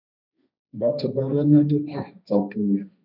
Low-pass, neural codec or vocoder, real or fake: 5.4 kHz; codec, 16 kHz, 1.1 kbps, Voila-Tokenizer; fake